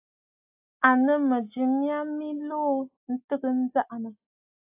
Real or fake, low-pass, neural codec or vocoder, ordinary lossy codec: real; 3.6 kHz; none; AAC, 24 kbps